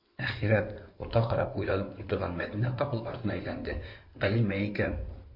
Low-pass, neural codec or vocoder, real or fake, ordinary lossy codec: 5.4 kHz; codec, 16 kHz in and 24 kHz out, 2.2 kbps, FireRedTTS-2 codec; fake; MP3, 32 kbps